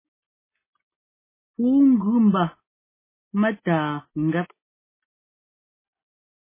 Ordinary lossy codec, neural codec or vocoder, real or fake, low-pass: MP3, 16 kbps; none; real; 3.6 kHz